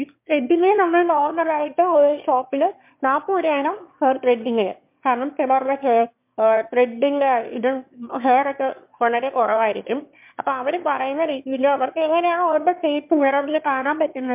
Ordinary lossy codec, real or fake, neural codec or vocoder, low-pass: MP3, 32 kbps; fake; autoencoder, 22.05 kHz, a latent of 192 numbers a frame, VITS, trained on one speaker; 3.6 kHz